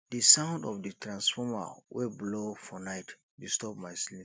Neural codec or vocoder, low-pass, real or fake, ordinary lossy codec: none; none; real; none